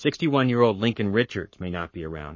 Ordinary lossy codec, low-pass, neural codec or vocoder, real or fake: MP3, 32 kbps; 7.2 kHz; codec, 44.1 kHz, 7.8 kbps, Pupu-Codec; fake